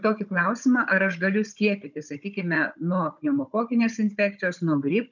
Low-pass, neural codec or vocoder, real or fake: 7.2 kHz; codec, 16 kHz, 16 kbps, FunCodec, trained on Chinese and English, 50 frames a second; fake